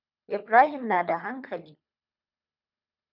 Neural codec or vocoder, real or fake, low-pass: codec, 24 kHz, 3 kbps, HILCodec; fake; 5.4 kHz